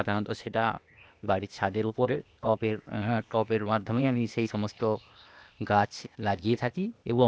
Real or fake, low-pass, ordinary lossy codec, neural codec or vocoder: fake; none; none; codec, 16 kHz, 0.8 kbps, ZipCodec